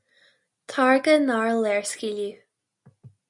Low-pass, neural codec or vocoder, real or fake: 10.8 kHz; none; real